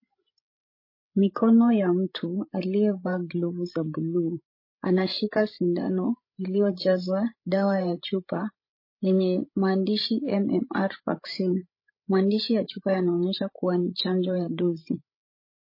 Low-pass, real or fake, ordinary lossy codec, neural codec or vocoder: 5.4 kHz; fake; MP3, 24 kbps; codec, 16 kHz, 16 kbps, FreqCodec, larger model